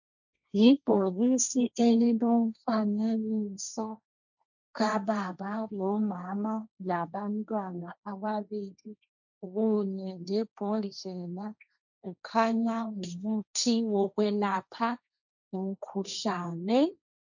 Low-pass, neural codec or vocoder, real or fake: 7.2 kHz; codec, 16 kHz, 1.1 kbps, Voila-Tokenizer; fake